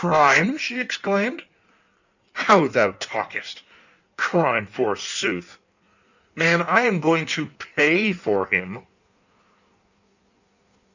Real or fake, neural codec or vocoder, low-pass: fake; codec, 16 kHz in and 24 kHz out, 1.1 kbps, FireRedTTS-2 codec; 7.2 kHz